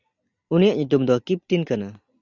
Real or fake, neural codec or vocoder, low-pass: real; none; 7.2 kHz